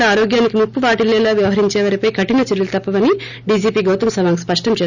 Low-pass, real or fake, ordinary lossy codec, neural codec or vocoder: 7.2 kHz; real; none; none